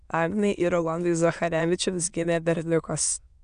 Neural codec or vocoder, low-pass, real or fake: autoencoder, 22.05 kHz, a latent of 192 numbers a frame, VITS, trained on many speakers; 9.9 kHz; fake